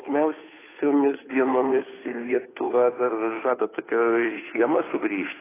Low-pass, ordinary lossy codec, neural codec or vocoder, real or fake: 3.6 kHz; AAC, 16 kbps; codec, 16 kHz, 2 kbps, FunCodec, trained on Chinese and English, 25 frames a second; fake